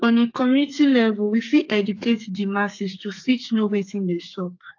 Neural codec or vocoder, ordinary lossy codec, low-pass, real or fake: codec, 32 kHz, 1.9 kbps, SNAC; AAC, 48 kbps; 7.2 kHz; fake